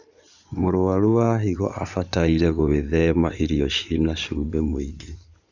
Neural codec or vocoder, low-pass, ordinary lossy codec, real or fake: codec, 16 kHz in and 24 kHz out, 2.2 kbps, FireRedTTS-2 codec; 7.2 kHz; none; fake